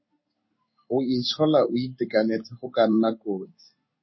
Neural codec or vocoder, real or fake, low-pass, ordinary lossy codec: codec, 16 kHz in and 24 kHz out, 1 kbps, XY-Tokenizer; fake; 7.2 kHz; MP3, 24 kbps